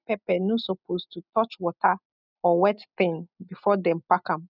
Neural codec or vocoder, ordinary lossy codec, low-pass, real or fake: none; none; 5.4 kHz; real